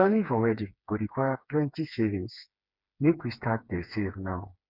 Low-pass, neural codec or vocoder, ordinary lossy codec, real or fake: 5.4 kHz; codec, 16 kHz, 4 kbps, FreqCodec, smaller model; none; fake